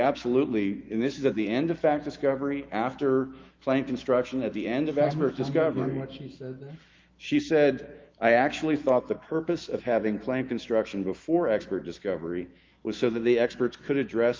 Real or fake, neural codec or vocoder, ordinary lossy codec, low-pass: fake; autoencoder, 48 kHz, 128 numbers a frame, DAC-VAE, trained on Japanese speech; Opus, 24 kbps; 7.2 kHz